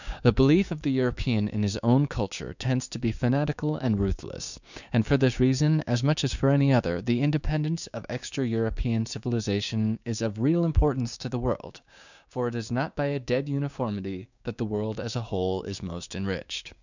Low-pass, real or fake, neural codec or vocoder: 7.2 kHz; fake; codec, 16 kHz, 6 kbps, DAC